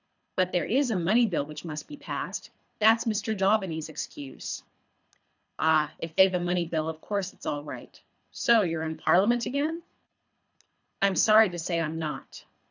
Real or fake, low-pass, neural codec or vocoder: fake; 7.2 kHz; codec, 24 kHz, 3 kbps, HILCodec